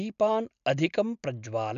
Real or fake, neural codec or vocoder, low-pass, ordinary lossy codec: real; none; 7.2 kHz; MP3, 96 kbps